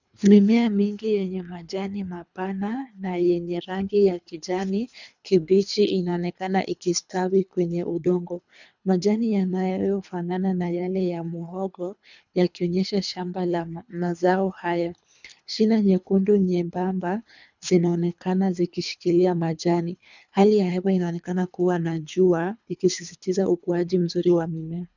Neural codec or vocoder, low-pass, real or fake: codec, 24 kHz, 3 kbps, HILCodec; 7.2 kHz; fake